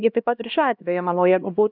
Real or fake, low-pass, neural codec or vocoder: fake; 5.4 kHz; codec, 16 kHz, 1 kbps, X-Codec, HuBERT features, trained on LibriSpeech